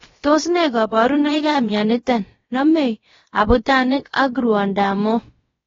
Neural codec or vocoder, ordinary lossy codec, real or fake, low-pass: codec, 16 kHz, about 1 kbps, DyCAST, with the encoder's durations; AAC, 24 kbps; fake; 7.2 kHz